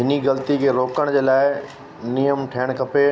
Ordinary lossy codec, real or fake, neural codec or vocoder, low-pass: none; real; none; none